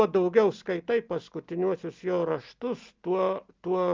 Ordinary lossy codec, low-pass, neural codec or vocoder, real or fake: Opus, 24 kbps; 7.2 kHz; none; real